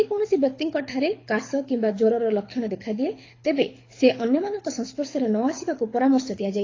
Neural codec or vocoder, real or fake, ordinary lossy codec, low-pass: codec, 24 kHz, 6 kbps, HILCodec; fake; AAC, 32 kbps; 7.2 kHz